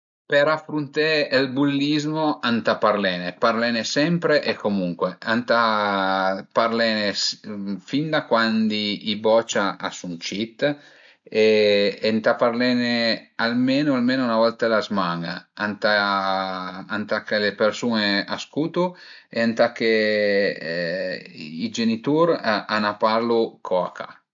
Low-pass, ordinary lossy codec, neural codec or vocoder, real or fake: 7.2 kHz; AAC, 64 kbps; none; real